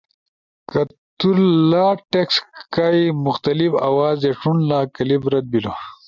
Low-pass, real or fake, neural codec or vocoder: 7.2 kHz; real; none